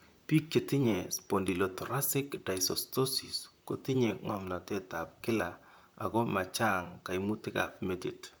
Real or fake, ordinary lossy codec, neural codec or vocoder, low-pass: fake; none; vocoder, 44.1 kHz, 128 mel bands, Pupu-Vocoder; none